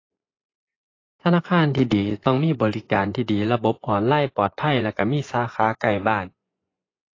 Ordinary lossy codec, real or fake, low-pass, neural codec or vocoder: AAC, 32 kbps; fake; 7.2 kHz; vocoder, 24 kHz, 100 mel bands, Vocos